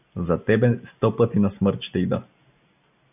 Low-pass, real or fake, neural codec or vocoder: 3.6 kHz; real; none